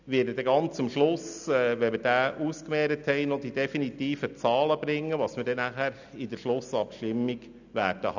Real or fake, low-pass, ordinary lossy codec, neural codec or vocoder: real; 7.2 kHz; none; none